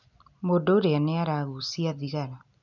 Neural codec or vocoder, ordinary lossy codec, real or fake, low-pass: none; none; real; 7.2 kHz